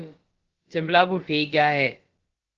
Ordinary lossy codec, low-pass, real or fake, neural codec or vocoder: Opus, 16 kbps; 7.2 kHz; fake; codec, 16 kHz, about 1 kbps, DyCAST, with the encoder's durations